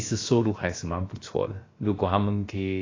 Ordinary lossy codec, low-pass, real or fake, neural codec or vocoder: AAC, 32 kbps; 7.2 kHz; fake; codec, 16 kHz, about 1 kbps, DyCAST, with the encoder's durations